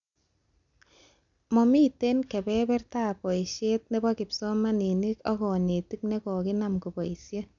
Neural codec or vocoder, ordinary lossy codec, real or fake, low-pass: none; none; real; 7.2 kHz